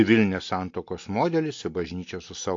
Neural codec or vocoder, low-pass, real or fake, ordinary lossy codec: none; 7.2 kHz; real; AAC, 48 kbps